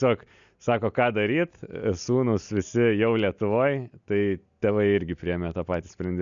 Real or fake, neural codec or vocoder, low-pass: real; none; 7.2 kHz